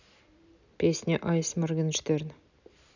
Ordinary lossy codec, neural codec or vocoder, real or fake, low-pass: none; none; real; 7.2 kHz